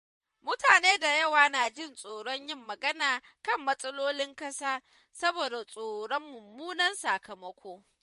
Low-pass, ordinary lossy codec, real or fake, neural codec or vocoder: 14.4 kHz; MP3, 48 kbps; fake; vocoder, 44.1 kHz, 128 mel bands, Pupu-Vocoder